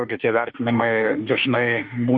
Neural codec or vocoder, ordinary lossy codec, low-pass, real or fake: autoencoder, 48 kHz, 32 numbers a frame, DAC-VAE, trained on Japanese speech; MP3, 48 kbps; 9.9 kHz; fake